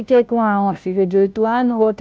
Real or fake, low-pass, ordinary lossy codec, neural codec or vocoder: fake; none; none; codec, 16 kHz, 0.5 kbps, FunCodec, trained on Chinese and English, 25 frames a second